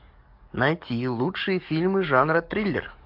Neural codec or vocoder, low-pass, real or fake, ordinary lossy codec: codec, 44.1 kHz, 7.8 kbps, DAC; 5.4 kHz; fake; MP3, 48 kbps